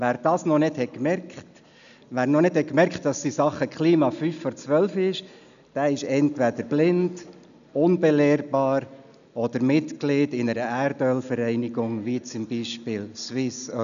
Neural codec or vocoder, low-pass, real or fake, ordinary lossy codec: none; 7.2 kHz; real; none